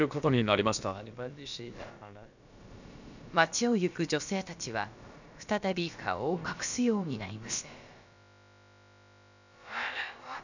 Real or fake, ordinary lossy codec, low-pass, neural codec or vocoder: fake; none; 7.2 kHz; codec, 16 kHz, about 1 kbps, DyCAST, with the encoder's durations